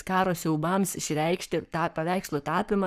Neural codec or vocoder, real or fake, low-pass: codec, 44.1 kHz, 7.8 kbps, Pupu-Codec; fake; 14.4 kHz